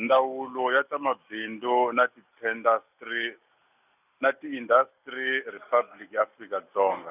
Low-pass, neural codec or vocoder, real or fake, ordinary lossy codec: 3.6 kHz; none; real; none